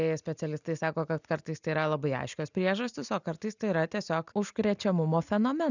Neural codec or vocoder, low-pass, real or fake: none; 7.2 kHz; real